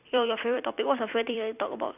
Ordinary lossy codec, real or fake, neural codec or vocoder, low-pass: none; fake; vocoder, 44.1 kHz, 128 mel bands every 256 samples, BigVGAN v2; 3.6 kHz